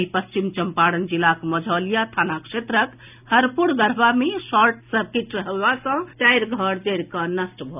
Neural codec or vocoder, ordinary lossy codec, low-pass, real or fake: none; none; 3.6 kHz; real